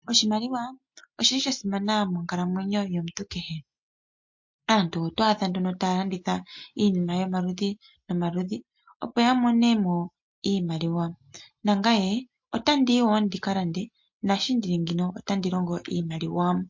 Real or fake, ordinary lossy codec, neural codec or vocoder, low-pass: real; MP3, 48 kbps; none; 7.2 kHz